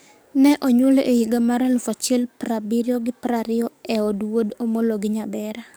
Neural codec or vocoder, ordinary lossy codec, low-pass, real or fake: codec, 44.1 kHz, 7.8 kbps, DAC; none; none; fake